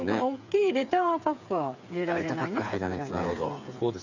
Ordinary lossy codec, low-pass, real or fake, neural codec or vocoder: none; 7.2 kHz; fake; codec, 16 kHz, 8 kbps, FreqCodec, smaller model